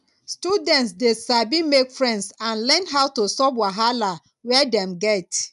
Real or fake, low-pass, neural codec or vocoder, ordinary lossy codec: real; 10.8 kHz; none; none